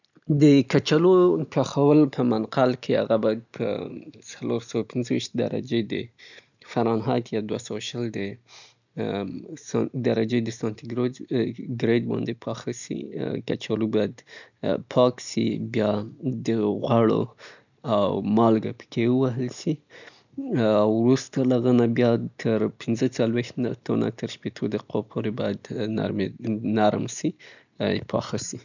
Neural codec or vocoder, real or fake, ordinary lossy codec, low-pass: none; real; none; 7.2 kHz